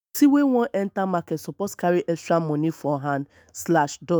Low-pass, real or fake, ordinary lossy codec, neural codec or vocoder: none; fake; none; autoencoder, 48 kHz, 128 numbers a frame, DAC-VAE, trained on Japanese speech